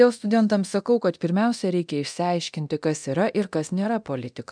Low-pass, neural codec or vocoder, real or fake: 9.9 kHz; codec, 24 kHz, 0.9 kbps, DualCodec; fake